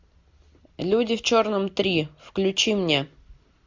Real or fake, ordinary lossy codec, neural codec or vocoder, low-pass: real; MP3, 64 kbps; none; 7.2 kHz